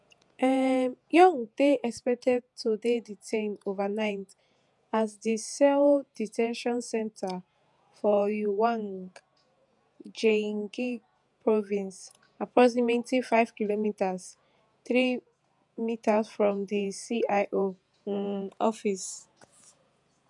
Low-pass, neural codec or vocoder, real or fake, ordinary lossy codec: 10.8 kHz; vocoder, 48 kHz, 128 mel bands, Vocos; fake; none